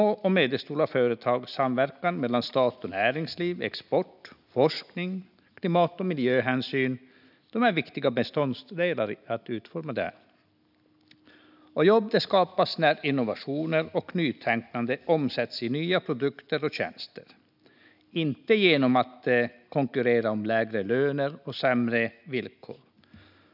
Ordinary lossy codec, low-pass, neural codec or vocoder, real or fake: none; 5.4 kHz; none; real